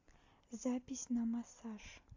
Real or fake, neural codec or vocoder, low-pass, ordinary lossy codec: real; none; 7.2 kHz; AAC, 48 kbps